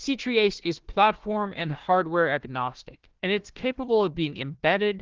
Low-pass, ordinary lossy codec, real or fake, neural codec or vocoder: 7.2 kHz; Opus, 16 kbps; fake; codec, 16 kHz, 1 kbps, FunCodec, trained on Chinese and English, 50 frames a second